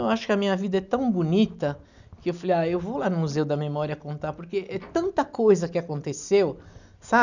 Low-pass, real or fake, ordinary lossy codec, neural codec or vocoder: 7.2 kHz; real; none; none